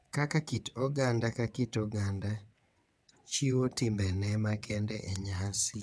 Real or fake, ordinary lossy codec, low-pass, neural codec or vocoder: fake; none; none; vocoder, 22.05 kHz, 80 mel bands, WaveNeXt